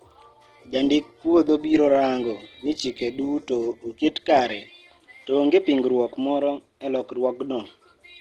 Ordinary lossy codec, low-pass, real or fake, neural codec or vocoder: Opus, 16 kbps; 19.8 kHz; real; none